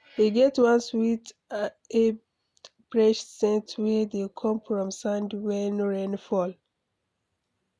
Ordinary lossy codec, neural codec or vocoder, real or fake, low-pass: none; none; real; none